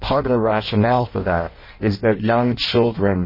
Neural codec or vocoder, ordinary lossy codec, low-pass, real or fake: codec, 16 kHz in and 24 kHz out, 0.6 kbps, FireRedTTS-2 codec; MP3, 24 kbps; 5.4 kHz; fake